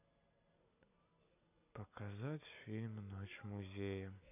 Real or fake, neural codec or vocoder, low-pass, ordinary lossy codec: real; none; 3.6 kHz; none